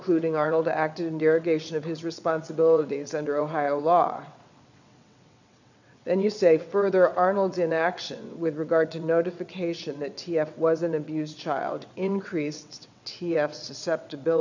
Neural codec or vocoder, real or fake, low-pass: vocoder, 22.05 kHz, 80 mel bands, WaveNeXt; fake; 7.2 kHz